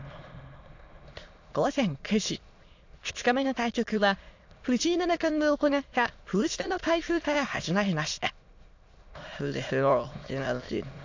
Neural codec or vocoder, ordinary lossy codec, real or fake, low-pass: autoencoder, 22.05 kHz, a latent of 192 numbers a frame, VITS, trained on many speakers; AAC, 48 kbps; fake; 7.2 kHz